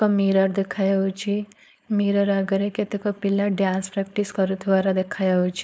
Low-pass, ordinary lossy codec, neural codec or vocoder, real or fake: none; none; codec, 16 kHz, 4.8 kbps, FACodec; fake